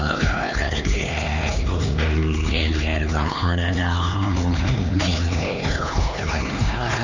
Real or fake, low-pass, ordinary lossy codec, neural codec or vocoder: fake; 7.2 kHz; Opus, 64 kbps; codec, 16 kHz, 2 kbps, X-Codec, HuBERT features, trained on LibriSpeech